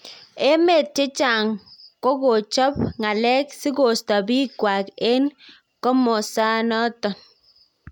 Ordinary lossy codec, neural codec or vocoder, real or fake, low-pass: none; none; real; 19.8 kHz